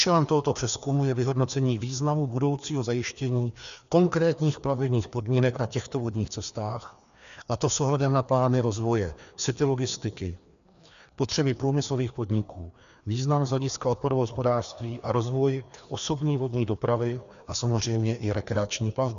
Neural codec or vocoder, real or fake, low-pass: codec, 16 kHz, 2 kbps, FreqCodec, larger model; fake; 7.2 kHz